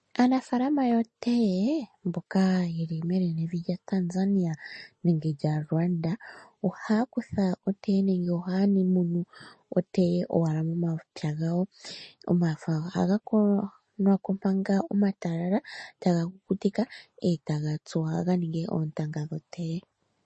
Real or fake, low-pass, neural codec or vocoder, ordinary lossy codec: real; 10.8 kHz; none; MP3, 32 kbps